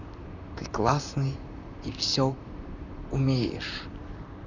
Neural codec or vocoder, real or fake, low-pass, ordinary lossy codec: vocoder, 44.1 kHz, 128 mel bands, Pupu-Vocoder; fake; 7.2 kHz; none